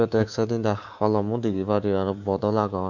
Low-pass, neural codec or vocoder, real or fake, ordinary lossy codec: 7.2 kHz; codec, 24 kHz, 3.1 kbps, DualCodec; fake; Opus, 64 kbps